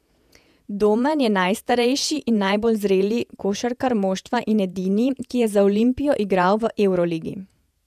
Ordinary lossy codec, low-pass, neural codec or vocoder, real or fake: none; 14.4 kHz; vocoder, 48 kHz, 128 mel bands, Vocos; fake